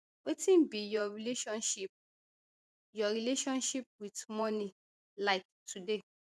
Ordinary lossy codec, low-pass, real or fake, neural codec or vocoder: none; none; fake; vocoder, 24 kHz, 100 mel bands, Vocos